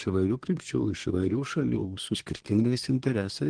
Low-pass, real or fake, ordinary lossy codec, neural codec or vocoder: 9.9 kHz; fake; Opus, 16 kbps; codec, 24 kHz, 1 kbps, SNAC